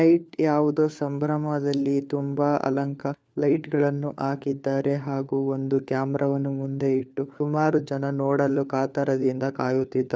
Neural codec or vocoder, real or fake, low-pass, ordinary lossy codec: codec, 16 kHz, 4 kbps, FunCodec, trained on LibriTTS, 50 frames a second; fake; none; none